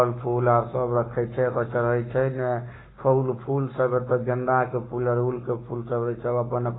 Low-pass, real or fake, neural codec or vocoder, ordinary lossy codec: 7.2 kHz; fake; codec, 44.1 kHz, 7.8 kbps, Pupu-Codec; AAC, 16 kbps